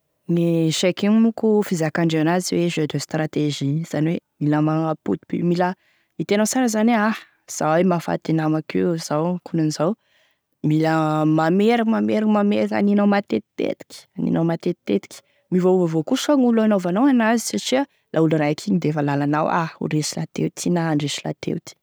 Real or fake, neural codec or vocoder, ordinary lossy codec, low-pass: real; none; none; none